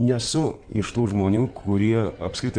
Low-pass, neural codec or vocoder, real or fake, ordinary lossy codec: 9.9 kHz; codec, 16 kHz in and 24 kHz out, 2.2 kbps, FireRedTTS-2 codec; fake; Opus, 64 kbps